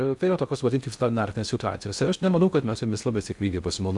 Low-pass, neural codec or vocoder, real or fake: 10.8 kHz; codec, 16 kHz in and 24 kHz out, 0.6 kbps, FocalCodec, streaming, 2048 codes; fake